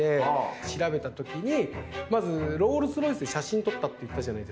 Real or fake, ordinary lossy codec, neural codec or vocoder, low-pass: real; none; none; none